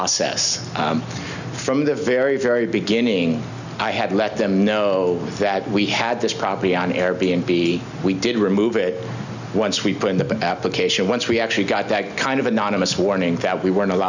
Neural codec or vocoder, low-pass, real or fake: none; 7.2 kHz; real